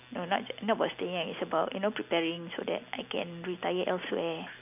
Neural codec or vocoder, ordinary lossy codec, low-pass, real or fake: none; none; 3.6 kHz; real